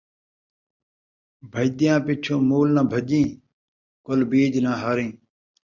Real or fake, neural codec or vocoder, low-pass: real; none; 7.2 kHz